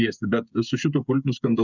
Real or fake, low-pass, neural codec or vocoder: fake; 7.2 kHz; codec, 16 kHz, 8 kbps, FreqCodec, smaller model